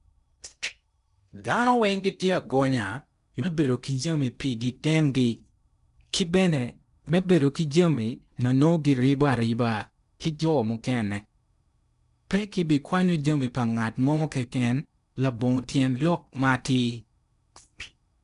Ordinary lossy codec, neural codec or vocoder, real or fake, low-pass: none; codec, 16 kHz in and 24 kHz out, 0.8 kbps, FocalCodec, streaming, 65536 codes; fake; 10.8 kHz